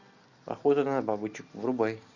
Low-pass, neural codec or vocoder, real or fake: 7.2 kHz; none; real